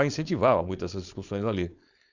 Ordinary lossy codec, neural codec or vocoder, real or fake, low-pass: none; codec, 16 kHz, 4.8 kbps, FACodec; fake; 7.2 kHz